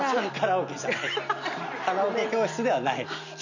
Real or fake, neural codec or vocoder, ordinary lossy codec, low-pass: real; none; none; 7.2 kHz